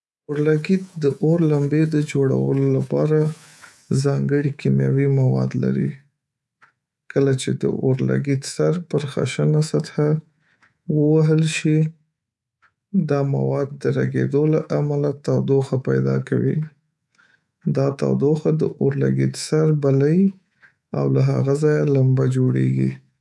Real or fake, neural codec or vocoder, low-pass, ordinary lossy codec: fake; codec, 24 kHz, 3.1 kbps, DualCodec; none; none